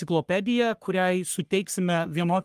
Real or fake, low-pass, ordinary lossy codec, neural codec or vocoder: fake; 14.4 kHz; Opus, 32 kbps; codec, 44.1 kHz, 3.4 kbps, Pupu-Codec